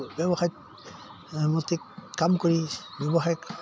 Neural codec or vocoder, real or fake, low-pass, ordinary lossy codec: none; real; none; none